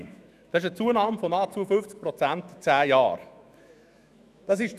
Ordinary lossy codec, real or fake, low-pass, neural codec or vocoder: none; fake; 14.4 kHz; autoencoder, 48 kHz, 128 numbers a frame, DAC-VAE, trained on Japanese speech